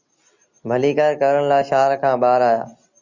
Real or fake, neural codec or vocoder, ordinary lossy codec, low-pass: real; none; Opus, 64 kbps; 7.2 kHz